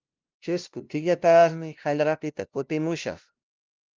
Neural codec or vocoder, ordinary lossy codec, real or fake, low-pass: codec, 16 kHz, 0.5 kbps, FunCodec, trained on LibriTTS, 25 frames a second; Opus, 32 kbps; fake; 7.2 kHz